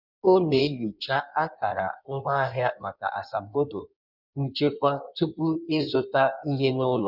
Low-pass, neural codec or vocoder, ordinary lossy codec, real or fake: 5.4 kHz; codec, 16 kHz in and 24 kHz out, 1.1 kbps, FireRedTTS-2 codec; none; fake